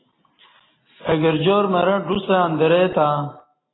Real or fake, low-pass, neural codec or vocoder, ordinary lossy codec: real; 7.2 kHz; none; AAC, 16 kbps